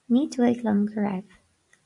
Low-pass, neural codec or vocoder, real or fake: 10.8 kHz; none; real